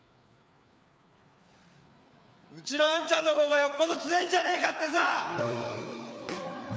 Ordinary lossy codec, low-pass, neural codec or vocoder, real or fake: none; none; codec, 16 kHz, 4 kbps, FreqCodec, larger model; fake